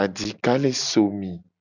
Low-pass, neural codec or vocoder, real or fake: 7.2 kHz; none; real